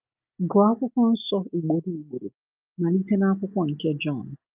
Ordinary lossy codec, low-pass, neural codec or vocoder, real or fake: Opus, 32 kbps; 3.6 kHz; none; real